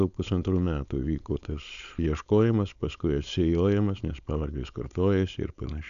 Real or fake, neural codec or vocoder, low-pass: fake; codec, 16 kHz, 4.8 kbps, FACodec; 7.2 kHz